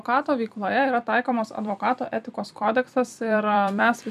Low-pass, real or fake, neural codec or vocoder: 14.4 kHz; fake; vocoder, 44.1 kHz, 128 mel bands every 256 samples, BigVGAN v2